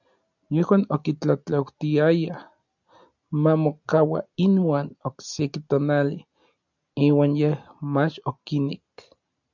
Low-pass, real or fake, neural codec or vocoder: 7.2 kHz; real; none